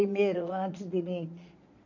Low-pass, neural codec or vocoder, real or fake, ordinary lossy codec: 7.2 kHz; vocoder, 22.05 kHz, 80 mel bands, Vocos; fake; none